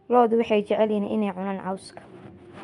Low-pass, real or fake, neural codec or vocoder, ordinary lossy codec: 10.8 kHz; fake; vocoder, 24 kHz, 100 mel bands, Vocos; none